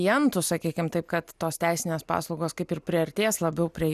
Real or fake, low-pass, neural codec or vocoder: real; 14.4 kHz; none